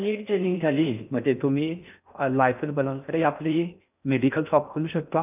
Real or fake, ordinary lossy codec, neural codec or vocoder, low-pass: fake; none; codec, 16 kHz in and 24 kHz out, 0.6 kbps, FocalCodec, streaming, 4096 codes; 3.6 kHz